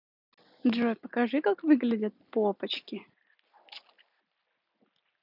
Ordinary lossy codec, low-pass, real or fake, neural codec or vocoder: none; 5.4 kHz; real; none